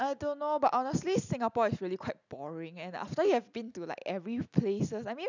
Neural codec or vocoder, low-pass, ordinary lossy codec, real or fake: none; 7.2 kHz; none; real